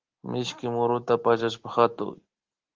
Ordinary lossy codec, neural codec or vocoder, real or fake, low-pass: Opus, 16 kbps; none; real; 7.2 kHz